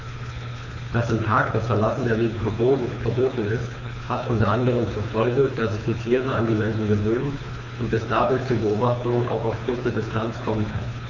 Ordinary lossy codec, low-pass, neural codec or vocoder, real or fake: none; 7.2 kHz; codec, 24 kHz, 3 kbps, HILCodec; fake